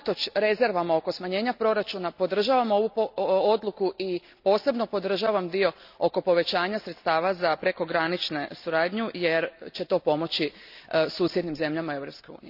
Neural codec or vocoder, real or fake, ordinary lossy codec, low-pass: none; real; none; 5.4 kHz